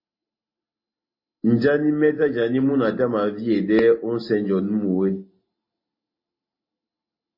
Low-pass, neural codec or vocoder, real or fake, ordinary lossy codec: 5.4 kHz; none; real; MP3, 24 kbps